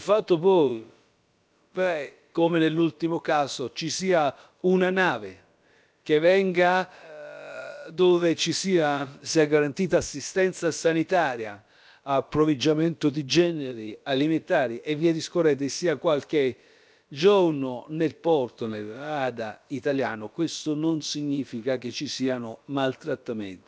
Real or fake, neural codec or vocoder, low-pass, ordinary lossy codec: fake; codec, 16 kHz, about 1 kbps, DyCAST, with the encoder's durations; none; none